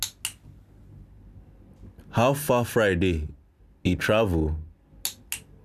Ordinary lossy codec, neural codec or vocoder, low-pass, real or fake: none; none; 14.4 kHz; real